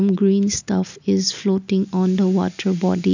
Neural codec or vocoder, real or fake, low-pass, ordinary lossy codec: none; real; 7.2 kHz; none